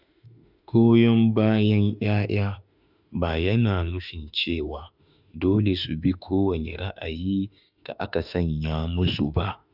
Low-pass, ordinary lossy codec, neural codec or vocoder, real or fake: 5.4 kHz; Opus, 64 kbps; autoencoder, 48 kHz, 32 numbers a frame, DAC-VAE, trained on Japanese speech; fake